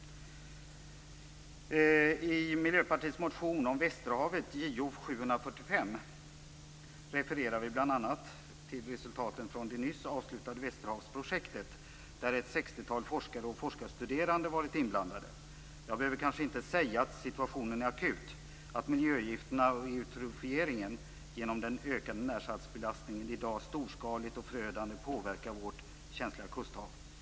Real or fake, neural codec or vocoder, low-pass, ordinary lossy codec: real; none; none; none